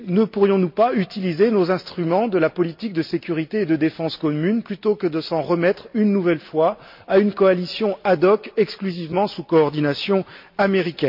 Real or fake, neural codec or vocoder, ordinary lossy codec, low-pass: real; none; MP3, 48 kbps; 5.4 kHz